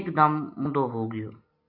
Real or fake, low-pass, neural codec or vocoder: real; 5.4 kHz; none